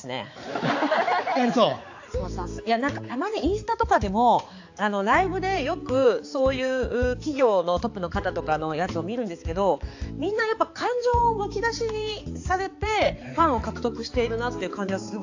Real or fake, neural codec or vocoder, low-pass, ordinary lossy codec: fake; codec, 16 kHz, 4 kbps, X-Codec, HuBERT features, trained on balanced general audio; 7.2 kHz; AAC, 48 kbps